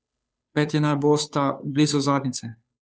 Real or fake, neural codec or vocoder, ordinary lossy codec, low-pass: fake; codec, 16 kHz, 2 kbps, FunCodec, trained on Chinese and English, 25 frames a second; none; none